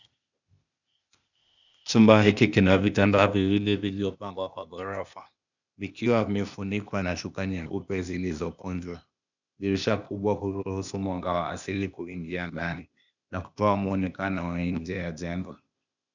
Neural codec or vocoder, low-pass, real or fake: codec, 16 kHz, 0.8 kbps, ZipCodec; 7.2 kHz; fake